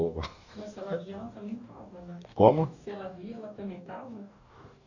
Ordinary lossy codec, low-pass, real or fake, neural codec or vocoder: none; 7.2 kHz; fake; codec, 44.1 kHz, 2.6 kbps, DAC